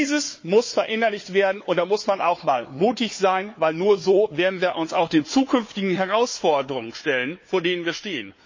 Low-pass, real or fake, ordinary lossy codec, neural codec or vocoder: 7.2 kHz; fake; MP3, 32 kbps; codec, 16 kHz, 4 kbps, FunCodec, trained on Chinese and English, 50 frames a second